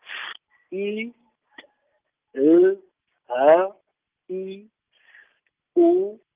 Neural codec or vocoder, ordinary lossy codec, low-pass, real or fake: none; Opus, 24 kbps; 3.6 kHz; real